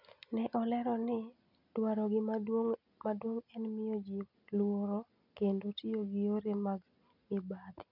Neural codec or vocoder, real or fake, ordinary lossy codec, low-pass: none; real; none; 5.4 kHz